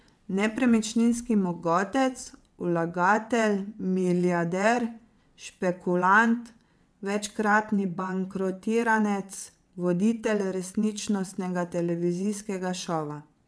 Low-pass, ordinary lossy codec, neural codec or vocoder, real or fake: none; none; vocoder, 22.05 kHz, 80 mel bands, WaveNeXt; fake